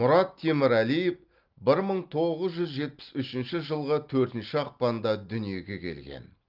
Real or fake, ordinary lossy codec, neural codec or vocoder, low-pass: real; Opus, 32 kbps; none; 5.4 kHz